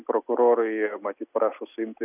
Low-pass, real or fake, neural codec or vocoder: 3.6 kHz; real; none